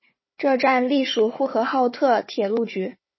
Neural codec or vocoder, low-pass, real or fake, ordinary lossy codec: codec, 16 kHz, 4 kbps, FunCodec, trained on Chinese and English, 50 frames a second; 7.2 kHz; fake; MP3, 24 kbps